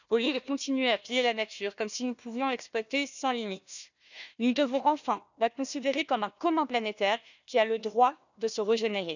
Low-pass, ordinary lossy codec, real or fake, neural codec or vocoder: 7.2 kHz; none; fake; codec, 16 kHz, 1 kbps, FunCodec, trained on Chinese and English, 50 frames a second